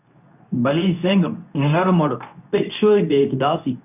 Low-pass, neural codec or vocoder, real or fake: 3.6 kHz; codec, 24 kHz, 0.9 kbps, WavTokenizer, medium speech release version 2; fake